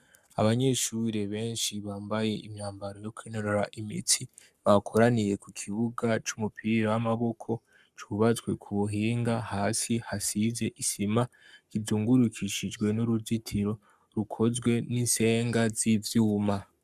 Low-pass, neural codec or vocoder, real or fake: 14.4 kHz; codec, 44.1 kHz, 7.8 kbps, Pupu-Codec; fake